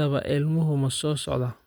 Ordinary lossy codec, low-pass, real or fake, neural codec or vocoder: none; none; real; none